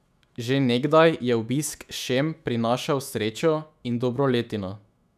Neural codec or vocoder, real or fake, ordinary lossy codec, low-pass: autoencoder, 48 kHz, 128 numbers a frame, DAC-VAE, trained on Japanese speech; fake; none; 14.4 kHz